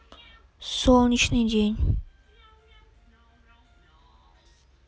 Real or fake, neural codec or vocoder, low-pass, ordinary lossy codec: real; none; none; none